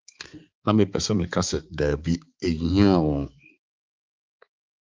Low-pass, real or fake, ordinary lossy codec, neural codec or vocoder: 7.2 kHz; fake; Opus, 32 kbps; codec, 16 kHz, 4 kbps, X-Codec, HuBERT features, trained on balanced general audio